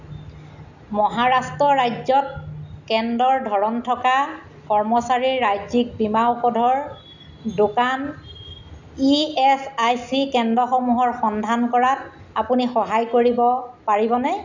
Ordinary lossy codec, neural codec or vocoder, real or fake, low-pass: none; none; real; 7.2 kHz